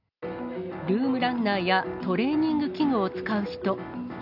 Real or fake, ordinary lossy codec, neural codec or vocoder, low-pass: real; none; none; 5.4 kHz